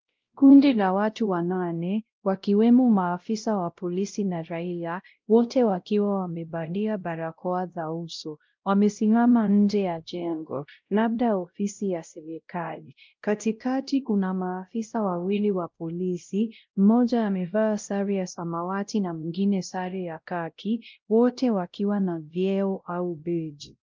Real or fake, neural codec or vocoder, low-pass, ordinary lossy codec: fake; codec, 16 kHz, 0.5 kbps, X-Codec, WavLM features, trained on Multilingual LibriSpeech; 7.2 kHz; Opus, 32 kbps